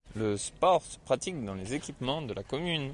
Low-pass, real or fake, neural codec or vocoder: 10.8 kHz; real; none